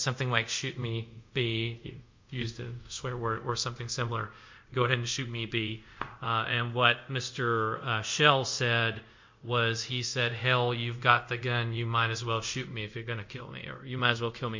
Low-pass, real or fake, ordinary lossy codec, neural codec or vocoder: 7.2 kHz; fake; MP3, 64 kbps; codec, 24 kHz, 0.5 kbps, DualCodec